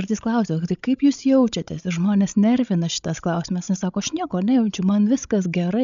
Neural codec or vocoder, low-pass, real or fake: codec, 16 kHz, 16 kbps, FreqCodec, larger model; 7.2 kHz; fake